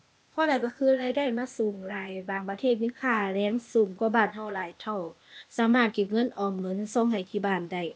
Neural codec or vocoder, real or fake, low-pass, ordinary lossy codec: codec, 16 kHz, 0.8 kbps, ZipCodec; fake; none; none